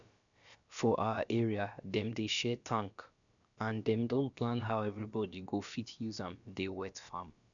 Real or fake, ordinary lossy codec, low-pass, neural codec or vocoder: fake; none; 7.2 kHz; codec, 16 kHz, about 1 kbps, DyCAST, with the encoder's durations